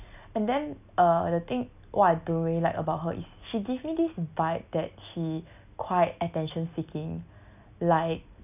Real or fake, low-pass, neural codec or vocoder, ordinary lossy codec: real; 3.6 kHz; none; none